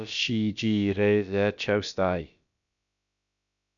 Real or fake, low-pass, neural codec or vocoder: fake; 7.2 kHz; codec, 16 kHz, about 1 kbps, DyCAST, with the encoder's durations